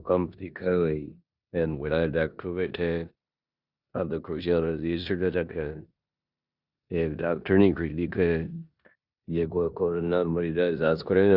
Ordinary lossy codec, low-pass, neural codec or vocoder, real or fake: Opus, 64 kbps; 5.4 kHz; codec, 16 kHz in and 24 kHz out, 0.9 kbps, LongCat-Audio-Codec, four codebook decoder; fake